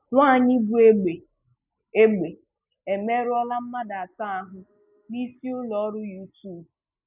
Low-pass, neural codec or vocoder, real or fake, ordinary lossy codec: 3.6 kHz; none; real; none